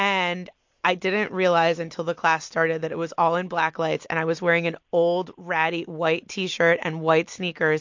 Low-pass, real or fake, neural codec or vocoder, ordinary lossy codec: 7.2 kHz; real; none; MP3, 48 kbps